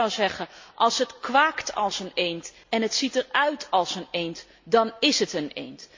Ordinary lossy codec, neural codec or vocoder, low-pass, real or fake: MP3, 48 kbps; none; 7.2 kHz; real